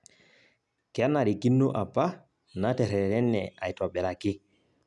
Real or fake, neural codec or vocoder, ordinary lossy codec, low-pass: real; none; none; 10.8 kHz